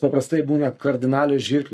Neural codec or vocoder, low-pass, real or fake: codec, 44.1 kHz, 7.8 kbps, Pupu-Codec; 14.4 kHz; fake